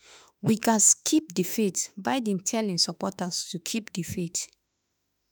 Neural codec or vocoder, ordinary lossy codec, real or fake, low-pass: autoencoder, 48 kHz, 32 numbers a frame, DAC-VAE, trained on Japanese speech; none; fake; none